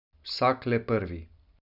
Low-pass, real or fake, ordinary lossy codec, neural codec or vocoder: 5.4 kHz; real; none; none